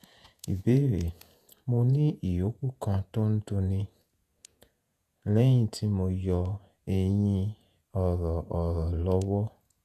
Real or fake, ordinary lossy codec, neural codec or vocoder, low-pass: fake; none; vocoder, 48 kHz, 128 mel bands, Vocos; 14.4 kHz